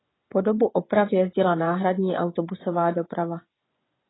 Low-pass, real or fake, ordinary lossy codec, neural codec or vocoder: 7.2 kHz; fake; AAC, 16 kbps; vocoder, 24 kHz, 100 mel bands, Vocos